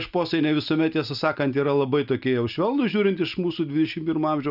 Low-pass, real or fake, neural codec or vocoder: 5.4 kHz; real; none